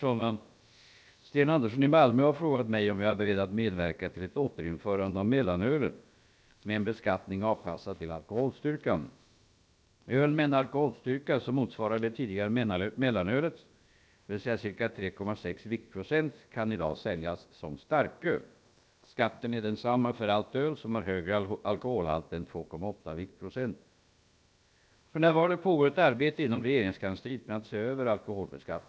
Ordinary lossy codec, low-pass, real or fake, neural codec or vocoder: none; none; fake; codec, 16 kHz, about 1 kbps, DyCAST, with the encoder's durations